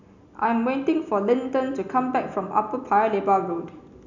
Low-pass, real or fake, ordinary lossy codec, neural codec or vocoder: 7.2 kHz; real; none; none